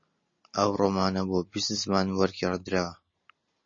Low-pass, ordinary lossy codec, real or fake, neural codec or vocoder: 7.2 kHz; MP3, 32 kbps; real; none